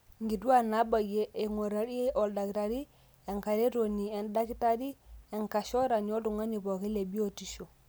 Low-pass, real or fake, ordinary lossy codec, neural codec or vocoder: none; real; none; none